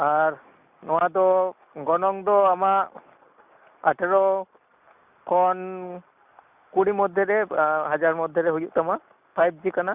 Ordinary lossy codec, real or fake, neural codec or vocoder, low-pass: Opus, 64 kbps; real; none; 3.6 kHz